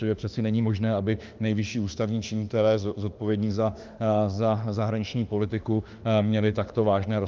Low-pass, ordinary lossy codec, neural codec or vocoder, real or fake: 7.2 kHz; Opus, 24 kbps; autoencoder, 48 kHz, 32 numbers a frame, DAC-VAE, trained on Japanese speech; fake